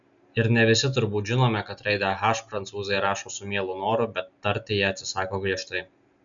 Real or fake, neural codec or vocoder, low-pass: real; none; 7.2 kHz